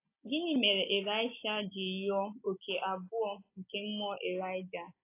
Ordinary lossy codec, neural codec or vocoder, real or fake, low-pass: AAC, 24 kbps; none; real; 3.6 kHz